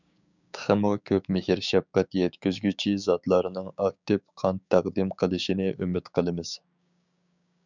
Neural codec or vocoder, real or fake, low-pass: codec, 16 kHz, 6 kbps, DAC; fake; 7.2 kHz